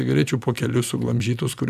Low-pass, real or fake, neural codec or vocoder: 14.4 kHz; fake; vocoder, 44.1 kHz, 128 mel bands every 256 samples, BigVGAN v2